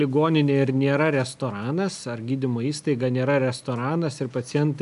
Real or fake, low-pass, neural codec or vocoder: real; 10.8 kHz; none